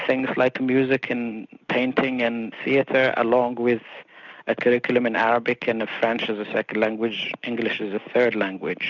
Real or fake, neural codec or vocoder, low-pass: real; none; 7.2 kHz